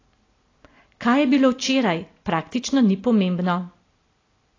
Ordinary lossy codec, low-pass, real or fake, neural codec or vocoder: AAC, 32 kbps; 7.2 kHz; real; none